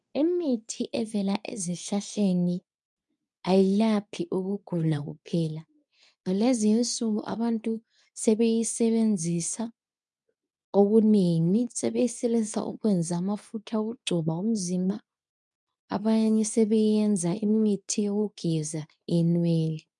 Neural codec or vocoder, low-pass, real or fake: codec, 24 kHz, 0.9 kbps, WavTokenizer, medium speech release version 2; 10.8 kHz; fake